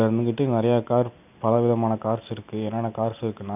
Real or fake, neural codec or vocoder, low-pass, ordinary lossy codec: real; none; 3.6 kHz; none